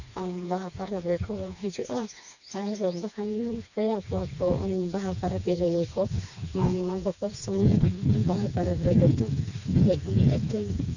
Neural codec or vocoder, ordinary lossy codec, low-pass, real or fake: codec, 16 kHz, 2 kbps, FreqCodec, smaller model; none; 7.2 kHz; fake